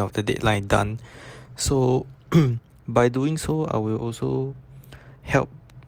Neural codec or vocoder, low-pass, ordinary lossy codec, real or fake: none; 19.8 kHz; Opus, 32 kbps; real